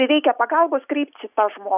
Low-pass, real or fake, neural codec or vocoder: 3.6 kHz; real; none